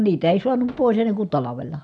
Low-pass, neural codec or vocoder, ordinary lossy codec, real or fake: none; none; none; real